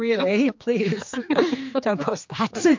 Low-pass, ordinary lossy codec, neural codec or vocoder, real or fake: 7.2 kHz; MP3, 48 kbps; codec, 16 kHz, 2 kbps, X-Codec, HuBERT features, trained on general audio; fake